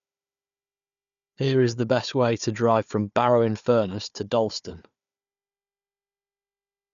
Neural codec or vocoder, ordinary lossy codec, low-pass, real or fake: codec, 16 kHz, 4 kbps, FunCodec, trained on Chinese and English, 50 frames a second; MP3, 96 kbps; 7.2 kHz; fake